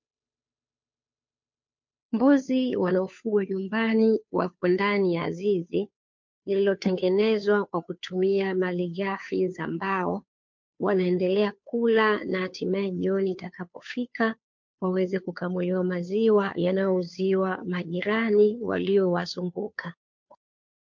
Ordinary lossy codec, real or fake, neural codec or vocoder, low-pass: MP3, 48 kbps; fake; codec, 16 kHz, 2 kbps, FunCodec, trained on Chinese and English, 25 frames a second; 7.2 kHz